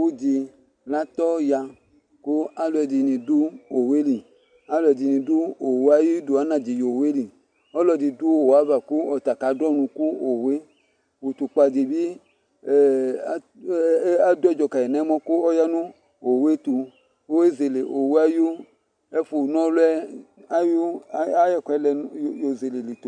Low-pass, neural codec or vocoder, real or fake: 9.9 kHz; none; real